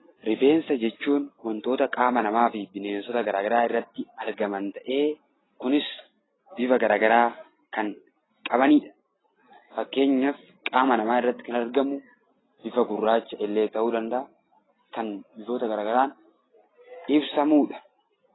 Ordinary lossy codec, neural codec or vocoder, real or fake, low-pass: AAC, 16 kbps; none; real; 7.2 kHz